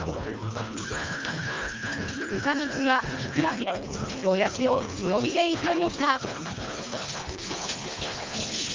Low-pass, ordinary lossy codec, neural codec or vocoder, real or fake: 7.2 kHz; Opus, 24 kbps; codec, 24 kHz, 1.5 kbps, HILCodec; fake